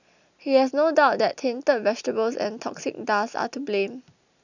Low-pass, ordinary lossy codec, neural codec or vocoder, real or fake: 7.2 kHz; none; none; real